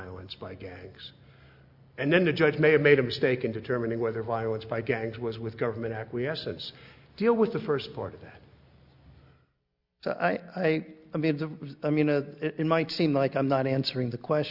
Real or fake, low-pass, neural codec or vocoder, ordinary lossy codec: real; 5.4 kHz; none; Opus, 64 kbps